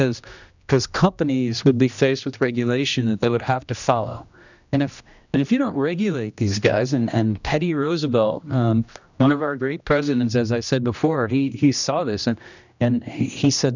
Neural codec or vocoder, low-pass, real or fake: codec, 16 kHz, 1 kbps, X-Codec, HuBERT features, trained on general audio; 7.2 kHz; fake